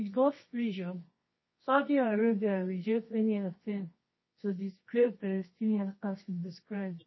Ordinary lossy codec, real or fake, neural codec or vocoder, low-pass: MP3, 24 kbps; fake; codec, 24 kHz, 0.9 kbps, WavTokenizer, medium music audio release; 7.2 kHz